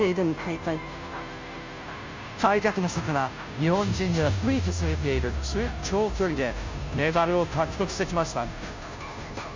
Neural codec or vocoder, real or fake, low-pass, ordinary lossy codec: codec, 16 kHz, 0.5 kbps, FunCodec, trained on Chinese and English, 25 frames a second; fake; 7.2 kHz; MP3, 64 kbps